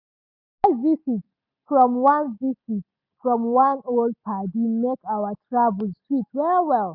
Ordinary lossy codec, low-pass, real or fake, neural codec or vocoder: none; 5.4 kHz; real; none